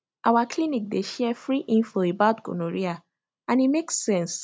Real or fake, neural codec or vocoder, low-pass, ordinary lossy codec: real; none; none; none